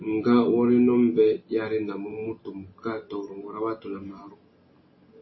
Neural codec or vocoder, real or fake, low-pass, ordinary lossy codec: none; real; 7.2 kHz; MP3, 24 kbps